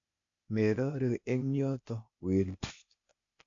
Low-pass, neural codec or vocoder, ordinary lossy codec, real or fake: 7.2 kHz; codec, 16 kHz, 0.8 kbps, ZipCodec; AAC, 48 kbps; fake